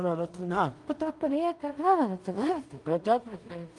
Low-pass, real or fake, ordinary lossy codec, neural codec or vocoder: 10.8 kHz; fake; Opus, 32 kbps; codec, 16 kHz in and 24 kHz out, 0.4 kbps, LongCat-Audio-Codec, two codebook decoder